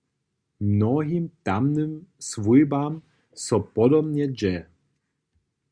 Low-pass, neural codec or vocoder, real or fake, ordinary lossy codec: 9.9 kHz; none; real; Opus, 64 kbps